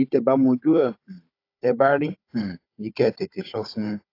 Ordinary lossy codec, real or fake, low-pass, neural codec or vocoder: AAC, 32 kbps; fake; 5.4 kHz; codec, 16 kHz, 16 kbps, FunCodec, trained on Chinese and English, 50 frames a second